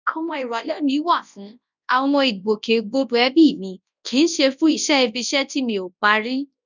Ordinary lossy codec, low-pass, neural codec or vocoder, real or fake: none; 7.2 kHz; codec, 24 kHz, 0.9 kbps, WavTokenizer, large speech release; fake